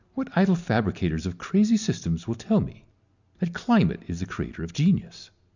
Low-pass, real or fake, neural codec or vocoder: 7.2 kHz; real; none